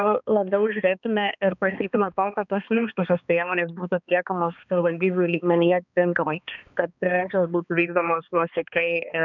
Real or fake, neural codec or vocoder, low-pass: fake; codec, 16 kHz, 2 kbps, X-Codec, HuBERT features, trained on balanced general audio; 7.2 kHz